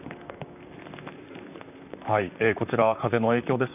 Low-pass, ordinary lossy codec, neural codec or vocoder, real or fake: 3.6 kHz; none; vocoder, 44.1 kHz, 128 mel bands, Pupu-Vocoder; fake